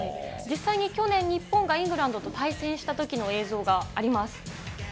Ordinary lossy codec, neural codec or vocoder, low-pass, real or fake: none; none; none; real